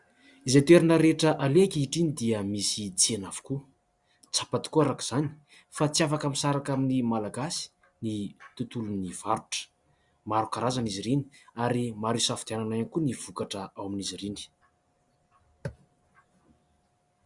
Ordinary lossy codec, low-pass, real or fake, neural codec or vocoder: Opus, 64 kbps; 10.8 kHz; real; none